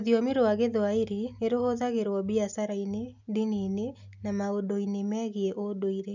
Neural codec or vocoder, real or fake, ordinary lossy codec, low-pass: none; real; none; 7.2 kHz